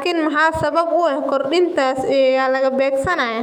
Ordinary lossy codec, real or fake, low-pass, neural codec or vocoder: none; fake; 19.8 kHz; autoencoder, 48 kHz, 128 numbers a frame, DAC-VAE, trained on Japanese speech